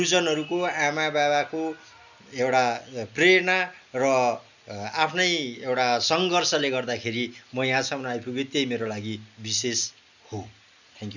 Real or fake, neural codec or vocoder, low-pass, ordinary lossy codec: real; none; 7.2 kHz; none